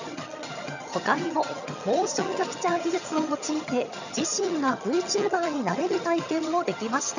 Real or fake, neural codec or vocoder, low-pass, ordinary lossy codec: fake; vocoder, 22.05 kHz, 80 mel bands, HiFi-GAN; 7.2 kHz; none